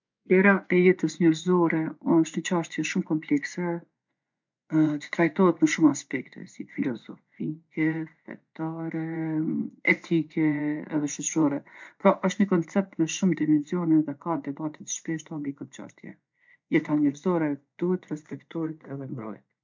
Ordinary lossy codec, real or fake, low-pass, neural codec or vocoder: MP3, 64 kbps; fake; 7.2 kHz; vocoder, 24 kHz, 100 mel bands, Vocos